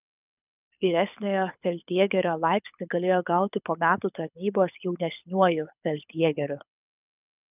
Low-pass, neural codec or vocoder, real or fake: 3.6 kHz; codec, 24 kHz, 6 kbps, HILCodec; fake